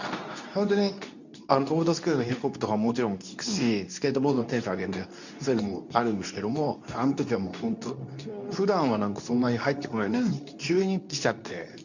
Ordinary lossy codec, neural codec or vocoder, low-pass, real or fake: none; codec, 24 kHz, 0.9 kbps, WavTokenizer, medium speech release version 2; 7.2 kHz; fake